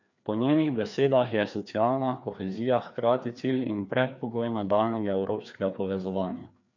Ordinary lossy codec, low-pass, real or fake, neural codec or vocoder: none; 7.2 kHz; fake; codec, 16 kHz, 2 kbps, FreqCodec, larger model